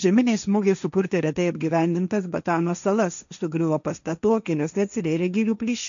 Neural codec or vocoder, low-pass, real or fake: codec, 16 kHz, 1.1 kbps, Voila-Tokenizer; 7.2 kHz; fake